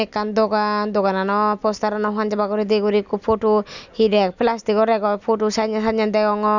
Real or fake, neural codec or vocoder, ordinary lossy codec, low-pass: real; none; none; 7.2 kHz